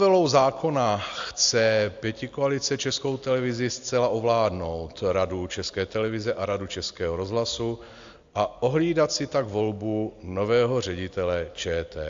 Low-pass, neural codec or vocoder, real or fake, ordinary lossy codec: 7.2 kHz; none; real; AAC, 64 kbps